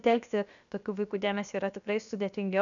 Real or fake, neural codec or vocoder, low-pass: fake; codec, 16 kHz, 0.7 kbps, FocalCodec; 7.2 kHz